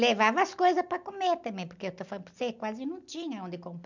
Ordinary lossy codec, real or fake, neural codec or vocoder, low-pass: none; real; none; 7.2 kHz